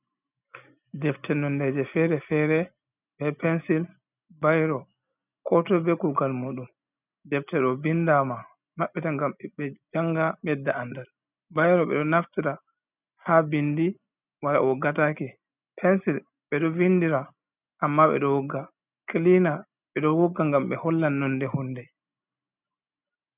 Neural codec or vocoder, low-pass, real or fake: none; 3.6 kHz; real